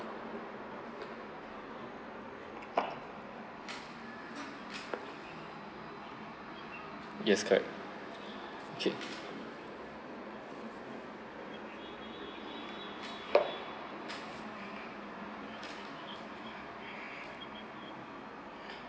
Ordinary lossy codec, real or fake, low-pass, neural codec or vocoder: none; real; none; none